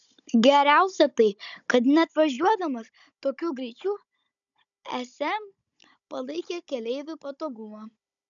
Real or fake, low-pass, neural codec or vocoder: fake; 7.2 kHz; codec, 16 kHz, 16 kbps, FunCodec, trained on Chinese and English, 50 frames a second